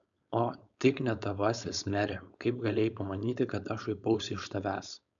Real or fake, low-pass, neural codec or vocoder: fake; 7.2 kHz; codec, 16 kHz, 4.8 kbps, FACodec